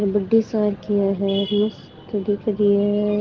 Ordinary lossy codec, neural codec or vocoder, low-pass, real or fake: Opus, 16 kbps; none; 7.2 kHz; real